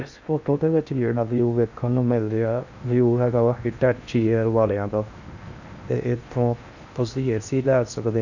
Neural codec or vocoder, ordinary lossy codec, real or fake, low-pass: codec, 16 kHz in and 24 kHz out, 0.8 kbps, FocalCodec, streaming, 65536 codes; none; fake; 7.2 kHz